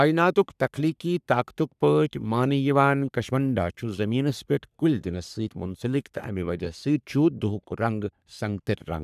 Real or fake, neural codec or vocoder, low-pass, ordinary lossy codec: fake; codec, 44.1 kHz, 3.4 kbps, Pupu-Codec; 14.4 kHz; none